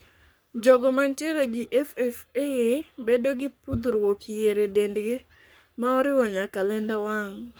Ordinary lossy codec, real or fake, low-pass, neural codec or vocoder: none; fake; none; codec, 44.1 kHz, 3.4 kbps, Pupu-Codec